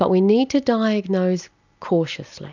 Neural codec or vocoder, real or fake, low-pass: none; real; 7.2 kHz